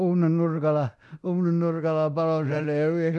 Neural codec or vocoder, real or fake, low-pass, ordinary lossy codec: codec, 24 kHz, 0.9 kbps, DualCodec; fake; none; none